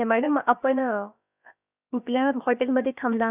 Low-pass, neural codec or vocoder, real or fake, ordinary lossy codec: 3.6 kHz; codec, 16 kHz, about 1 kbps, DyCAST, with the encoder's durations; fake; none